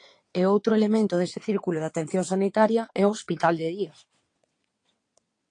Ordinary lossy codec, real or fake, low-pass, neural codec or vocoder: AAC, 48 kbps; fake; 9.9 kHz; vocoder, 22.05 kHz, 80 mel bands, WaveNeXt